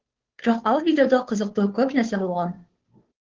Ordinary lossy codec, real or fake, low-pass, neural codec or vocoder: Opus, 16 kbps; fake; 7.2 kHz; codec, 16 kHz, 2 kbps, FunCodec, trained on Chinese and English, 25 frames a second